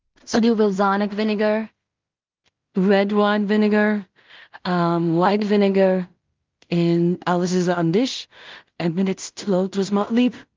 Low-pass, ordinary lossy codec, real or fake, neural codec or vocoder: 7.2 kHz; Opus, 32 kbps; fake; codec, 16 kHz in and 24 kHz out, 0.4 kbps, LongCat-Audio-Codec, two codebook decoder